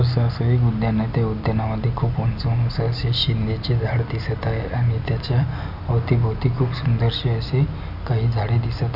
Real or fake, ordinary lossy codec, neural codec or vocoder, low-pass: real; none; none; 5.4 kHz